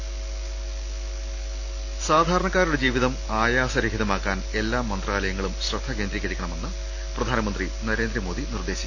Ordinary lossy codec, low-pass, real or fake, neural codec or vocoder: AAC, 48 kbps; 7.2 kHz; real; none